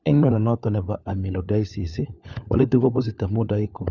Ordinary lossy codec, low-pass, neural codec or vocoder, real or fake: Opus, 64 kbps; 7.2 kHz; codec, 16 kHz, 4 kbps, FunCodec, trained on LibriTTS, 50 frames a second; fake